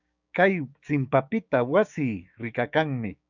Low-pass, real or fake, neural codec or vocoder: 7.2 kHz; fake; codec, 16 kHz, 6 kbps, DAC